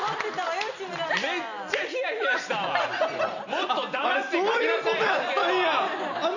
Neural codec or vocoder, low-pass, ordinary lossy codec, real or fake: none; 7.2 kHz; none; real